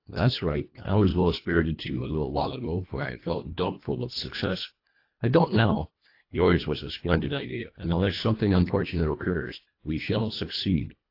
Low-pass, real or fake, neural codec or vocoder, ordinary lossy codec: 5.4 kHz; fake; codec, 24 kHz, 1.5 kbps, HILCodec; AAC, 32 kbps